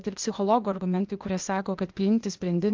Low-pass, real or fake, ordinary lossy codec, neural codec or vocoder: 7.2 kHz; fake; Opus, 32 kbps; codec, 16 kHz, 0.8 kbps, ZipCodec